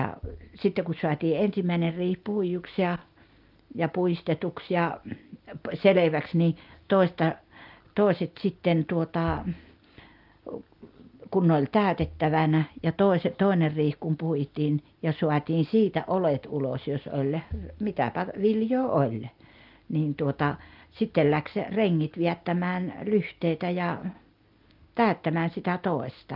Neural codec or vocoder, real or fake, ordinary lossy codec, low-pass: none; real; Opus, 24 kbps; 5.4 kHz